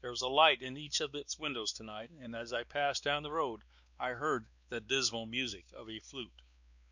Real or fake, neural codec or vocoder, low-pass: fake; codec, 16 kHz, 2 kbps, X-Codec, WavLM features, trained on Multilingual LibriSpeech; 7.2 kHz